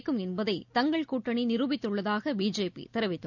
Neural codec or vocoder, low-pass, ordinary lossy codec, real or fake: none; 7.2 kHz; none; real